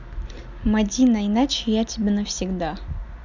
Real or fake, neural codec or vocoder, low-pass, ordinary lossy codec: real; none; 7.2 kHz; none